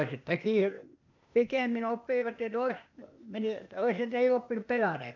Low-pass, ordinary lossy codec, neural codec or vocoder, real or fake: 7.2 kHz; none; codec, 16 kHz, 0.8 kbps, ZipCodec; fake